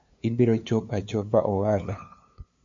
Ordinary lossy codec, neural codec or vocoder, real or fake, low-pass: MP3, 64 kbps; codec, 16 kHz, 2 kbps, FunCodec, trained on LibriTTS, 25 frames a second; fake; 7.2 kHz